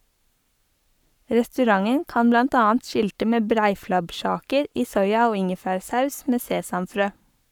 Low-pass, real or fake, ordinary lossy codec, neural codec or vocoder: 19.8 kHz; fake; none; codec, 44.1 kHz, 7.8 kbps, Pupu-Codec